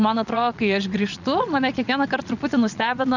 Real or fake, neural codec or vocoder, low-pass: fake; vocoder, 22.05 kHz, 80 mel bands, Vocos; 7.2 kHz